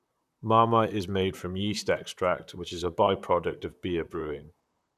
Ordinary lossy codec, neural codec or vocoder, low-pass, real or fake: none; vocoder, 44.1 kHz, 128 mel bands, Pupu-Vocoder; 14.4 kHz; fake